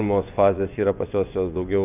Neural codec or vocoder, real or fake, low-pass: vocoder, 24 kHz, 100 mel bands, Vocos; fake; 3.6 kHz